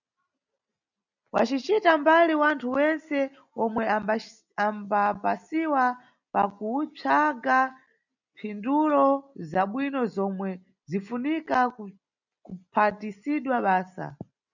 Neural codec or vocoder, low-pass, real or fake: none; 7.2 kHz; real